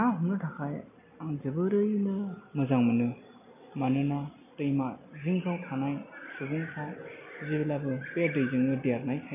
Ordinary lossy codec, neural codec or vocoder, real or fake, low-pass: none; none; real; 3.6 kHz